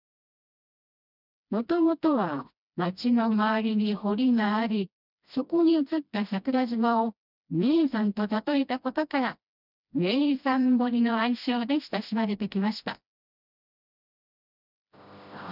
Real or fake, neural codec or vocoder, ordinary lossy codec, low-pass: fake; codec, 16 kHz, 1 kbps, FreqCodec, smaller model; none; 5.4 kHz